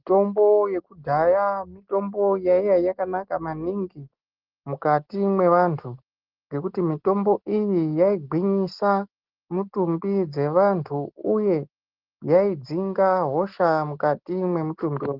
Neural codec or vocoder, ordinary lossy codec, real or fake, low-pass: none; Opus, 16 kbps; real; 5.4 kHz